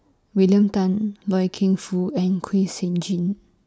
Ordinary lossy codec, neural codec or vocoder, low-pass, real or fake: none; none; none; real